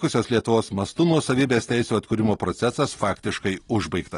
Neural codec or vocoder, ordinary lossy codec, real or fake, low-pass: none; AAC, 32 kbps; real; 10.8 kHz